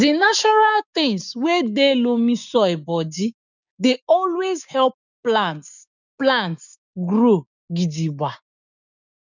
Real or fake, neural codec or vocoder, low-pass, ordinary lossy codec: fake; codec, 16 kHz, 6 kbps, DAC; 7.2 kHz; none